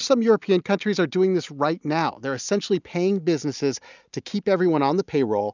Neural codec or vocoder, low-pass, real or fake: none; 7.2 kHz; real